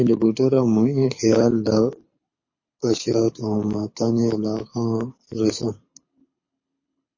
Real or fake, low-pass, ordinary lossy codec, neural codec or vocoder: fake; 7.2 kHz; MP3, 32 kbps; codec, 24 kHz, 6 kbps, HILCodec